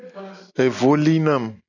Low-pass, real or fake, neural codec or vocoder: 7.2 kHz; real; none